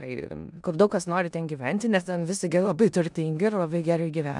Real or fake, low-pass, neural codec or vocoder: fake; 10.8 kHz; codec, 16 kHz in and 24 kHz out, 0.9 kbps, LongCat-Audio-Codec, four codebook decoder